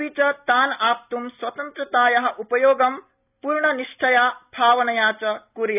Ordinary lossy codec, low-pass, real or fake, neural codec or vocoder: none; 3.6 kHz; real; none